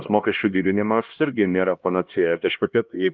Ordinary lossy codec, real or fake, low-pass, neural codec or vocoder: Opus, 32 kbps; fake; 7.2 kHz; codec, 16 kHz, 1 kbps, X-Codec, HuBERT features, trained on LibriSpeech